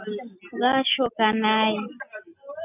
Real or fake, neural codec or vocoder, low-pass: real; none; 3.6 kHz